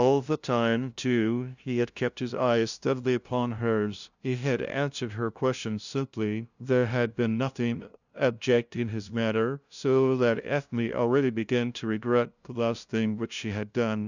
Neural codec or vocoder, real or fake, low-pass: codec, 16 kHz, 0.5 kbps, FunCodec, trained on LibriTTS, 25 frames a second; fake; 7.2 kHz